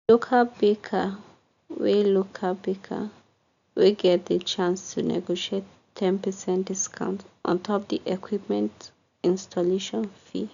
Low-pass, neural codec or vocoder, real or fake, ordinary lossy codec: 7.2 kHz; none; real; none